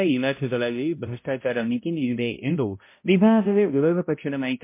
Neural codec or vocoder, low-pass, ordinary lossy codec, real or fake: codec, 16 kHz, 0.5 kbps, X-Codec, HuBERT features, trained on balanced general audio; 3.6 kHz; MP3, 24 kbps; fake